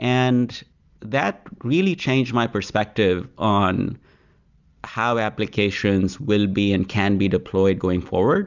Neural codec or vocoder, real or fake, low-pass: none; real; 7.2 kHz